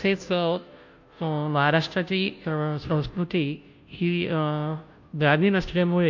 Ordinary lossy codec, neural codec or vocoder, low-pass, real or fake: MP3, 48 kbps; codec, 16 kHz, 0.5 kbps, FunCodec, trained on Chinese and English, 25 frames a second; 7.2 kHz; fake